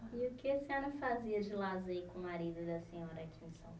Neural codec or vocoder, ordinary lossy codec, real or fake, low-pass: none; none; real; none